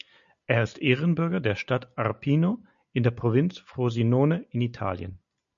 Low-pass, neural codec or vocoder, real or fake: 7.2 kHz; none; real